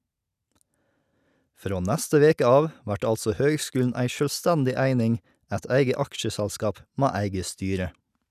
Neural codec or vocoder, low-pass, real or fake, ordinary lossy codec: none; 14.4 kHz; real; none